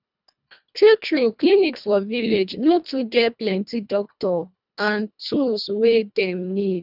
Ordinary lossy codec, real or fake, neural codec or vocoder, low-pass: none; fake; codec, 24 kHz, 1.5 kbps, HILCodec; 5.4 kHz